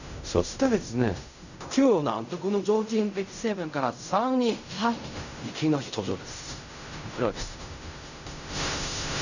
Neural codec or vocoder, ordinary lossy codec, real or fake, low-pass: codec, 16 kHz in and 24 kHz out, 0.4 kbps, LongCat-Audio-Codec, fine tuned four codebook decoder; none; fake; 7.2 kHz